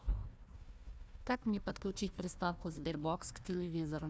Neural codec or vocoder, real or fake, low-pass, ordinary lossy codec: codec, 16 kHz, 1 kbps, FunCodec, trained on Chinese and English, 50 frames a second; fake; none; none